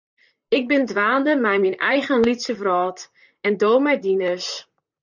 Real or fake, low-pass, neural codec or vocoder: fake; 7.2 kHz; vocoder, 44.1 kHz, 128 mel bands, Pupu-Vocoder